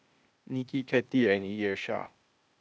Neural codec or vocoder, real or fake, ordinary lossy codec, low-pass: codec, 16 kHz, 0.8 kbps, ZipCodec; fake; none; none